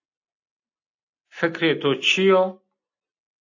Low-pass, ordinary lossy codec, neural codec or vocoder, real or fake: 7.2 kHz; AAC, 48 kbps; none; real